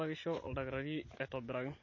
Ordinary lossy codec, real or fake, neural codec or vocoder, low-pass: MP3, 32 kbps; real; none; 7.2 kHz